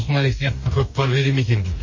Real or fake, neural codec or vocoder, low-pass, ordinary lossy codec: fake; codec, 44.1 kHz, 2.6 kbps, DAC; 7.2 kHz; MP3, 32 kbps